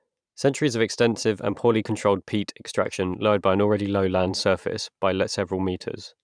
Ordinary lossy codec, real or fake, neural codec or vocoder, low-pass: none; fake; vocoder, 44.1 kHz, 128 mel bands every 512 samples, BigVGAN v2; 9.9 kHz